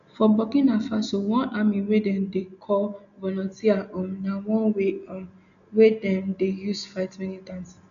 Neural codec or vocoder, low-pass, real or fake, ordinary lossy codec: none; 7.2 kHz; real; none